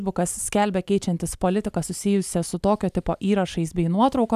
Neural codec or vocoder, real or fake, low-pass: none; real; 14.4 kHz